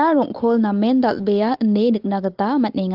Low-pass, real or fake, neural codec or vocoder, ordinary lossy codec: 5.4 kHz; real; none; Opus, 16 kbps